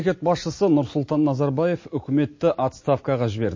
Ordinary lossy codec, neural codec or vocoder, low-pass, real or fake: MP3, 32 kbps; none; 7.2 kHz; real